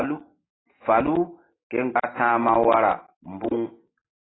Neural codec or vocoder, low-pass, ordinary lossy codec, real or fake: none; 7.2 kHz; AAC, 16 kbps; real